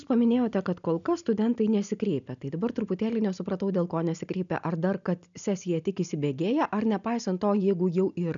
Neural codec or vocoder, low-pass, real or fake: none; 7.2 kHz; real